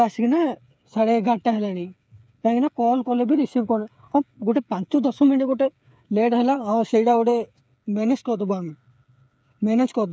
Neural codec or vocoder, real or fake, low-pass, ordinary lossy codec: codec, 16 kHz, 8 kbps, FreqCodec, smaller model; fake; none; none